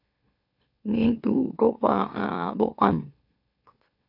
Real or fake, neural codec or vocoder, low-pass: fake; autoencoder, 44.1 kHz, a latent of 192 numbers a frame, MeloTTS; 5.4 kHz